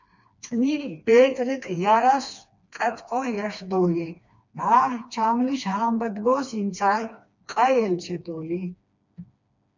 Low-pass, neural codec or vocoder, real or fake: 7.2 kHz; codec, 16 kHz, 2 kbps, FreqCodec, smaller model; fake